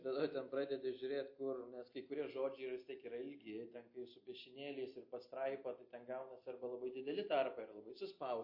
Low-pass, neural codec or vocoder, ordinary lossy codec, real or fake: 5.4 kHz; none; MP3, 32 kbps; real